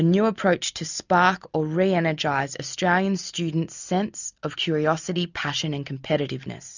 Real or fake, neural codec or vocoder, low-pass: real; none; 7.2 kHz